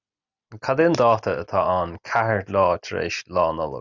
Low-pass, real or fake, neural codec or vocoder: 7.2 kHz; real; none